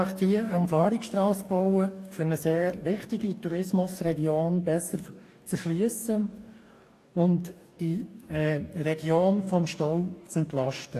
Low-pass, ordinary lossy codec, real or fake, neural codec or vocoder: 14.4 kHz; AAC, 64 kbps; fake; codec, 44.1 kHz, 2.6 kbps, DAC